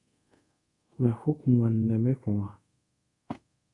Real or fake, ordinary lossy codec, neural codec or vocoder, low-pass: fake; Opus, 64 kbps; codec, 24 kHz, 0.5 kbps, DualCodec; 10.8 kHz